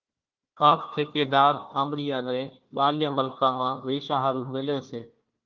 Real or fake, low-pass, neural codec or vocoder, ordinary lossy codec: fake; 7.2 kHz; codec, 16 kHz, 1 kbps, FunCodec, trained on Chinese and English, 50 frames a second; Opus, 32 kbps